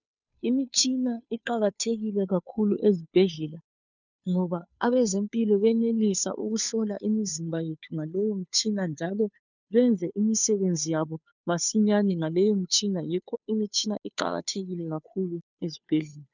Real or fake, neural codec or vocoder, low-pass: fake; codec, 16 kHz, 2 kbps, FunCodec, trained on Chinese and English, 25 frames a second; 7.2 kHz